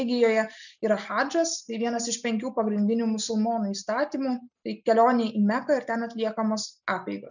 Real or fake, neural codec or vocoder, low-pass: real; none; 7.2 kHz